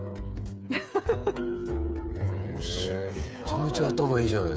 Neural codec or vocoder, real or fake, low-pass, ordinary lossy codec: codec, 16 kHz, 8 kbps, FreqCodec, smaller model; fake; none; none